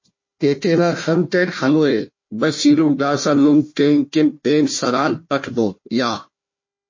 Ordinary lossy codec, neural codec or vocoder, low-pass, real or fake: MP3, 32 kbps; codec, 16 kHz, 1 kbps, FunCodec, trained on Chinese and English, 50 frames a second; 7.2 kHz; fake